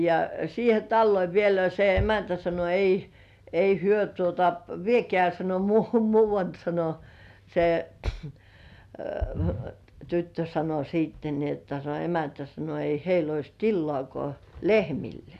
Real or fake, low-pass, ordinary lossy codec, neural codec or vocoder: real; 14.4 kHz; none; none